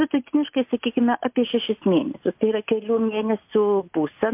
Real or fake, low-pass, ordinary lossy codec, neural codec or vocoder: real; 3.6 kHz; MP3, 32 kbps; none